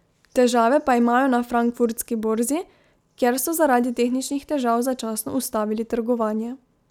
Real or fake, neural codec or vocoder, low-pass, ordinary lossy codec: real; none; 19.8 kHz; none